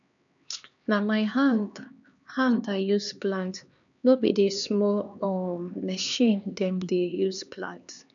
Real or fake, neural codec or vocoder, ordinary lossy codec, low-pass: fake; codec, 16 kHz, 2 kbps, X-Codec, HuBERT features, trained on LibriSpeech; none; 7.2 kHz